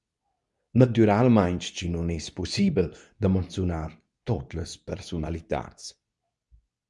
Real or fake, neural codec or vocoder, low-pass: fake; codec, 24 kHz, 0.9 kbps, WavTokenizer, medium speech release version 2; 10.8 kHz